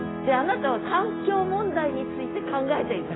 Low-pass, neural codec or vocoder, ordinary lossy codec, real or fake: 7.2 kHz; none; AAC, 16 kbps; real